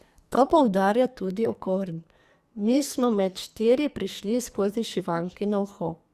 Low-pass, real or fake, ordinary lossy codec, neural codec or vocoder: 14.4 kHz; fake; Opus, 64 kbps; codec, 44.1 kHz, 2.6 kbps, SNAC